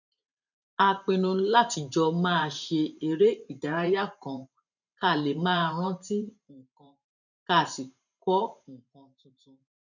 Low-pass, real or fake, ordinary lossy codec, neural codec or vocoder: 7.2 kHz; fake; none; vocoder, 44.1 kHz, 128 mel bands every 512 samples, BigVGAN v2